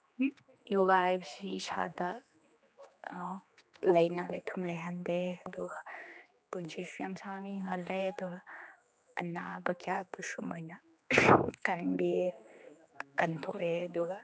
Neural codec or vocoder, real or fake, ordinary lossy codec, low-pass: codec, 16 kHz, 2 kbps, X-Codec, HuBERT features, trained on general audio; fake; none; none